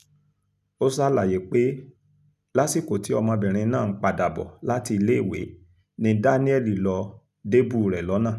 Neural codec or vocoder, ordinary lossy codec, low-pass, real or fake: none; none; 14.4 kHz; real